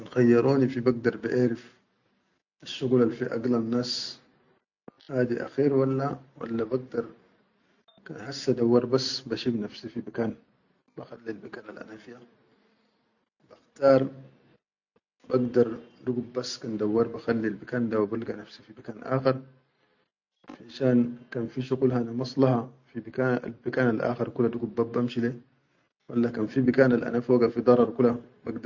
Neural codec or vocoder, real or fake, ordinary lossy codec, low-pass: none; real; none; 7.2 kHz